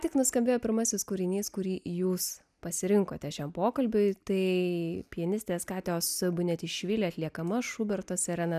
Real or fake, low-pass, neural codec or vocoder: real; 14.4 kHz; none